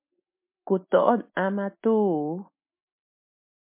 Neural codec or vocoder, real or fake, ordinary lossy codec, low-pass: none; real; MP3, 32 kbps; 3.6 kHz